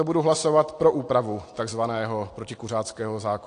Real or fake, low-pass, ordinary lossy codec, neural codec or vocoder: real; 9.9 kHz; AAC, 48 kbps; none